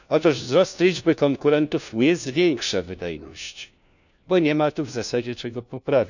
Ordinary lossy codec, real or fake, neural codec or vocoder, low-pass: none; fake; codec, 16 kHz, 1 kbps, FunCodec, trained on LibriTTS, 50 frames a second; 7.2 kHz